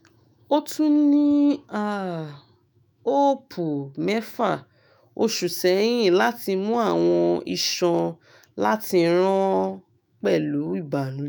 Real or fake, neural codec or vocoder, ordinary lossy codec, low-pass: fake; autoencoder, 48 kHz, 128 numbers a frame, DAC-VAE, trained on Japanese speech; none; none